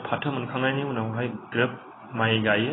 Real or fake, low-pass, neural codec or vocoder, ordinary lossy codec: real; 7.2 kHz; none; AAC, 16 kbps